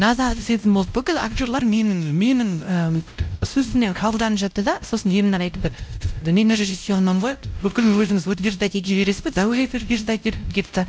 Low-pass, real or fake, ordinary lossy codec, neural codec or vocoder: none; fake; none; codec, 16 kHz, 0.5 kbps, X-Codec, WavLM features, trained on Multilingual LibriSpeech